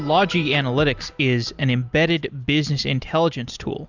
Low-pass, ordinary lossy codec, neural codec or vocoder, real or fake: 7.2 kHz; Opus, 64 kbps; none; real